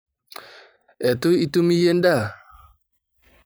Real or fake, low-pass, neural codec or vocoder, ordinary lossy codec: fake; none; vocoder, 44.1 kHz, 128 mel bands every 512 samples, BigVGAN v2; none